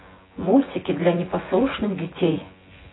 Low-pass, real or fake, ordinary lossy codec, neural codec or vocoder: 7.2 kHz; fake; AAC, 16 kbps; vocoder, 24 kHz, 100 mel bands, Vocos